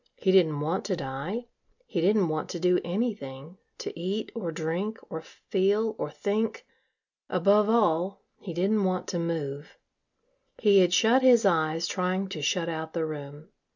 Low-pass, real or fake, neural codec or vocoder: 7.2 kHz; real; none